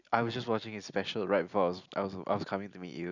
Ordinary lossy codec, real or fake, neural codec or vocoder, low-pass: none; real; none; 7.2 kHz